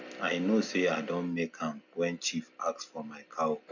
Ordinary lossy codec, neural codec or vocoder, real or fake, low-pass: none; none; real; 7.2 kHz